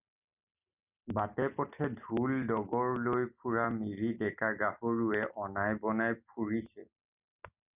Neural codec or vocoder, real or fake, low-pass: none; real; 3.6 kHz